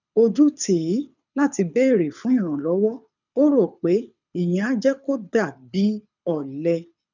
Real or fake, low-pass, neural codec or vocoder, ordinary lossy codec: fake; 7.2 kHz; codec, 24 kHz, 6 kbps, HILCodec; none